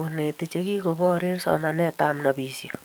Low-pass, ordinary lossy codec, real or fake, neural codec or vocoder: none; none; fake; codec, 44.1 kHz, 7.8 kbps, DAC